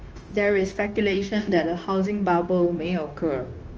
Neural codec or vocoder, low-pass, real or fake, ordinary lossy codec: codec, 16 kHz, 0.9 kbps, LongCat-Audio-Codec; 7.2 kHz; fake; Opus, 24 kbps